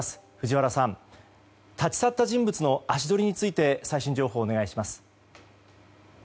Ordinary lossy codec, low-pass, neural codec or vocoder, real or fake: none; none; none; real